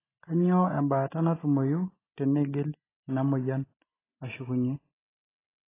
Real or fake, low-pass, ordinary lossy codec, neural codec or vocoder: real; 3.6 kHz; AAC, 16 kbps; none